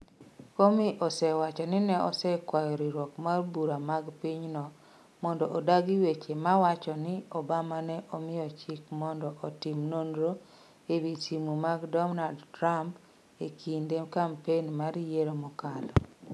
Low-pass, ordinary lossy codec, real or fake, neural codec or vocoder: none; none; real; none